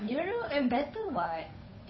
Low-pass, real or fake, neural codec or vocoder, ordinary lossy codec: 7.2 kHz; fake; codec, 16 kHz, 8 kbps, FunCodec, trained on Chinese and English, 25 frames a second; MP3, 24 kbps